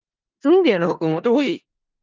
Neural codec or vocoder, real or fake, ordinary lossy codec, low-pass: codec, 16 kHz in and 24 kHz out, 0.4 kbps, LongCat-Audio-Codec, four codebook decoder; fake; Opus, 32 kbps; 7.2 kHz